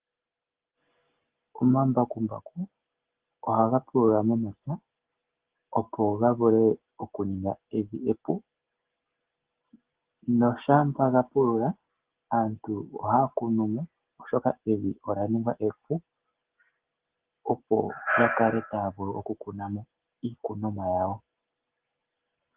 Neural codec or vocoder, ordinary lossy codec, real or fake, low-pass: none; Opus, 16 kbps; real; 3.6 kHz